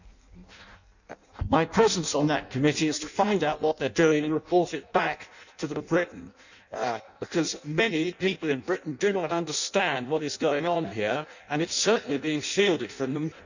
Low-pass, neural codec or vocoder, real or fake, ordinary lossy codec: 7.2 kHz; codec, 16 kHz in and 24 kHz out, 0.6 kbps, FireRedTTS-2 codec; fake; none